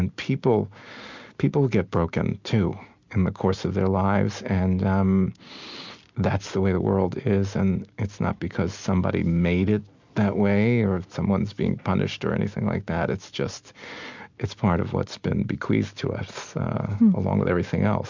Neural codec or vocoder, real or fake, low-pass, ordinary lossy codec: none; real; 7.2 kHz; MP3, 64 kbps